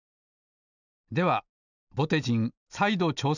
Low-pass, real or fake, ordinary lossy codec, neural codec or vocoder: 7.2 kHz; real; none; none